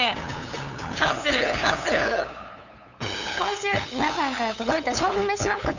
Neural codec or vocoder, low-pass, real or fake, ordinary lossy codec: codec, 16 kHz, 8 kbps, FunCodec, trained on LibriTTS, 25 frames a second; 7.2 kHz; fake; none